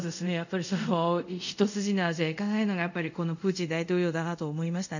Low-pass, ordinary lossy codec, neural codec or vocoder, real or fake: 7.2 kHz; none; codec, 24 kHz, 0.5 kbps, DualCodec; fake